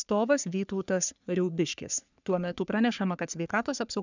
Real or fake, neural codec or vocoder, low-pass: fake; codec, 44.1 kHz, 3.4 kbps, Pupu-Codec; 7.2 kHz